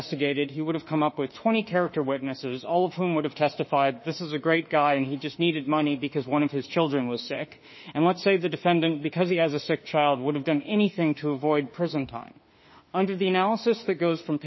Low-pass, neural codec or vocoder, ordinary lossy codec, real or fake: 7.2 kHz; autoencoder, 48 kHz, 32 numbers a frame, DAC-VAE, trained on Japanese speech; MP3, 24 kbps; fake